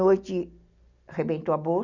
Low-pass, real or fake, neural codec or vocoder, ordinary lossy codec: 7.2 kHz; real; none; none